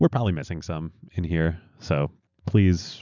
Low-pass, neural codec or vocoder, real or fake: 7.2 kHz; none; real